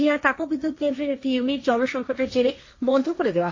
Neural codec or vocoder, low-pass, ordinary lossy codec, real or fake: codec, 16 kHz, 1.1 kbps, Voila-Tokenizer; 7.2 kHz; MP3, 32 kbps; fake